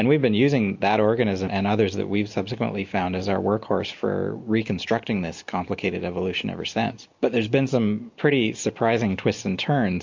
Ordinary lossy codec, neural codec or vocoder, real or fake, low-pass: MP3, 48 kbps; none; real; 7.2 kHz